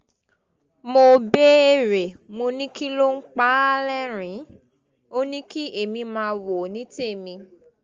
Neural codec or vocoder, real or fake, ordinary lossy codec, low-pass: none; real; Opus, 32 kbps; 7.2 kHz